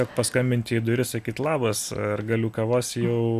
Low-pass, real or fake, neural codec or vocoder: 14.4 kHz; real; none